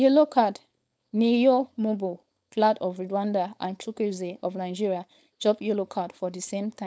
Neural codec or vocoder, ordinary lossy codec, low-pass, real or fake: codec, 16 kHz, 4.8 kbps, FACodec; none; none; fake